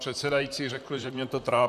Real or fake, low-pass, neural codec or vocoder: fake; 14.4 kHz; vocoder, 44.1 kHz, 128 mel bands, Pupu-Vocoder